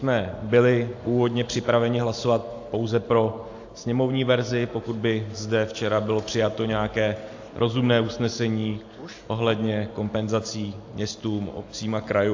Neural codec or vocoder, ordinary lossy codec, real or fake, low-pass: none; AAC, 48 kbps; real; 7.2 kHz